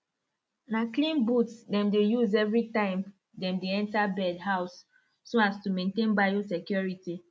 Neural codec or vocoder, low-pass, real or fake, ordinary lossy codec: none; none; real; none